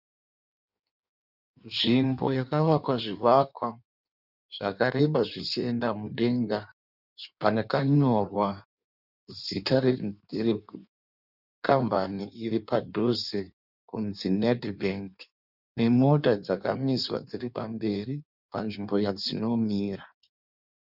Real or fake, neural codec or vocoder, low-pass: fake; codec, 16 kHz in and 24 kHz out, 1.1 kbps, FireRedTTS-2 codec; 5.4 kHz